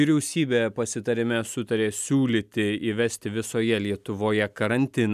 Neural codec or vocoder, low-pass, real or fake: none; 14.4 kHz; real